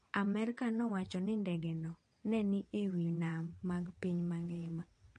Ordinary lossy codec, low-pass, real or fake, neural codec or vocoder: MP3, 48 kbps; 9.9 kHz; fake; vocoder, 22.05 kHz, 80 mel bands, WaveNeXt